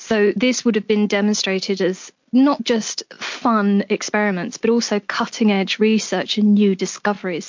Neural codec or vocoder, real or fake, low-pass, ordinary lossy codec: none; real; 7.2 kHz; MP3, 48 kbps